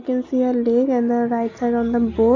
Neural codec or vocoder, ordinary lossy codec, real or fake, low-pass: none; none; real; 7.2 kHz